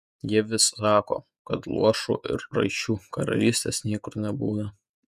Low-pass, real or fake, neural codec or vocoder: 14.4 kHz; fake; vocoder, 48 kHz, 128 mel bands, Vocos